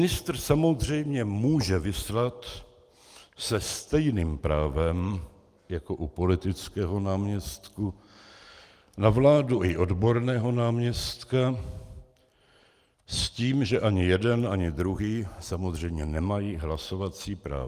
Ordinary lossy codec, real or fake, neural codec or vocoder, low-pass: Opus, 32 kbps; real; none; 14.4 kHz